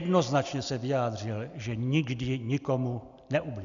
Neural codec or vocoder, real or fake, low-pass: none; real; 7.2 kHz